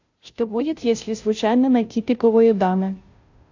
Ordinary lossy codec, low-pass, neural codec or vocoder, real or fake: AAC, 48 kbps; 7.2 kHz; codec, 16 kHz, 0.5 kbps, FunCodec, trained on Chinese and English, 25 frames a second; fake